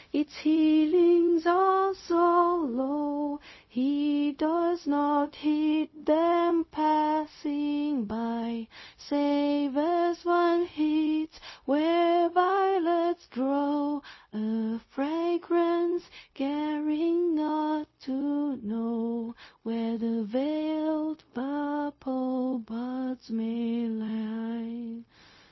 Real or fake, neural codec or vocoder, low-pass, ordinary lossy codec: fake; codec, 16 kHz, 0.4 kbps, LongCat-Audio-Codec; 7.2 kHz; MP3, 24 kbps